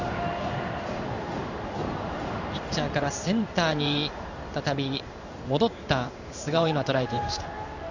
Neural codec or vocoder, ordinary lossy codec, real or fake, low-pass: codec, 16 kHz in and 24 kHz out, 1 kbps, XY-Tokenizer; none; fake; 7.2 kHz